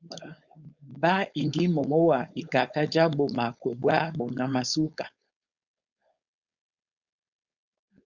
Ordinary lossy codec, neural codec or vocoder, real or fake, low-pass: Opus, 64 kbps; codec, 16 kHz, 4.8 kbps, FACodec; fake; 7.2 kHz